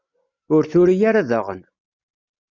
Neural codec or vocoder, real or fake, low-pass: none; real; 7.2 kHz